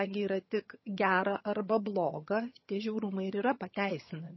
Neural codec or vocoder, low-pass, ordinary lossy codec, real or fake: vocoder, 22.05 kHz, 80 mel bands, HiFi-GAN; 7.2 kHz; MP3, 24 kbps; fake